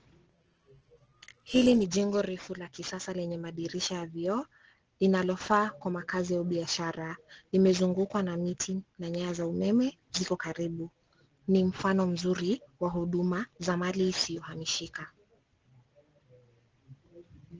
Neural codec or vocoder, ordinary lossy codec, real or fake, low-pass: none; Opus, 16 kbps; real; 7.2 kHz